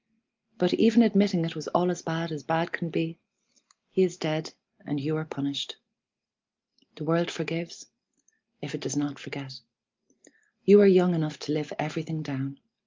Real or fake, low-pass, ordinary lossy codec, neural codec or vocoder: real; 7.2 kHz; Opus, 24 kbps; none